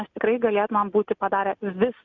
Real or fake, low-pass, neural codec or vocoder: real; 7.2 kHz; none